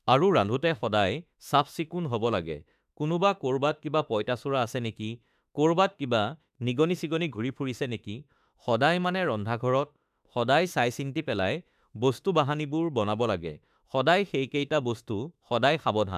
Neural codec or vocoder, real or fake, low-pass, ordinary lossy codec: autoencoder, 48 kHz, 32 numbers a frame, DAC-VAE, trained on Japanese speech; fake; 14.4 kHz; none